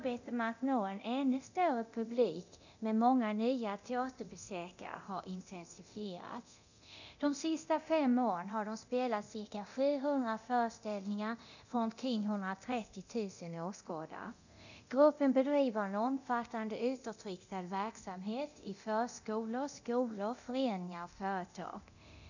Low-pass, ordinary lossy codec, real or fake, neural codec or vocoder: 7.2 kHz; none; fake; codec, 24 kHz, 0.9 kbps, DualCodec